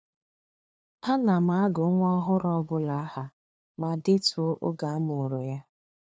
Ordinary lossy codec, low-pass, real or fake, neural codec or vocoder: none; none; fake; codec, 16 kHz, 2 kbps, FunCodec, trained on LibriTTS, 25 frames a second